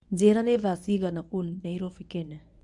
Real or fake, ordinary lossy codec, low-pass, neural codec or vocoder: fake; none; 10.8 kHz; codec, 24 kHz, 0.9 kbps, WavTokenizer, medium speech release version 1